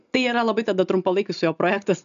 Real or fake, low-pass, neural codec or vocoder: real; 7.2 kHz; none